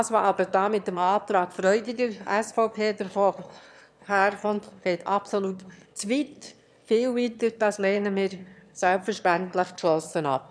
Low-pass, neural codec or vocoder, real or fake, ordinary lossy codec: none; autoencoder, 22.05 kHz, a latent of 192 numbers a frame, VITS, trained on one speaker; fake; none